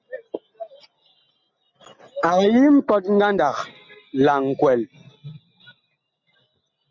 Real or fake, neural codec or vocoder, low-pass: real; none; 7.2 kHz